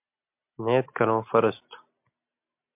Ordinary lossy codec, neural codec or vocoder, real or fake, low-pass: MP3, 32 kbps; none; real; 3.6 kHz